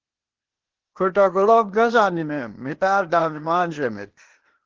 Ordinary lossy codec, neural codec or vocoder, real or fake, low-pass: Opus, 16 kbps; codec, 16 kHz, 0.8 kbps, ZipCodec; fake; 7.2 kHz